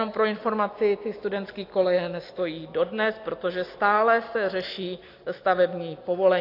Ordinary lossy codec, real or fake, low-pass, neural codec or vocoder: AAC, 32 kbps; real; 5.4 kHz; none